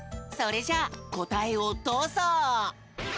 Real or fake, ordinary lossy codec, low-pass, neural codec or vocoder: real; none; none; none